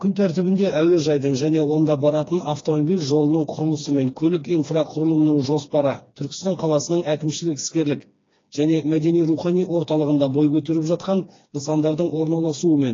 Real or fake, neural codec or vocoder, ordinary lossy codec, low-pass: fake; codec, 16 kHz, 2 kbps, FreqCodec, smaller model; AAC, 32 kbps; 7.2 kHz